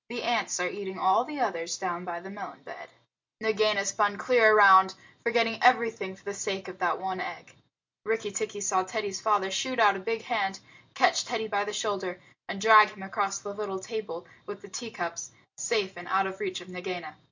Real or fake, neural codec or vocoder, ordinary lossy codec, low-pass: real; none; MP3, 48 kbps; 7.2 kHz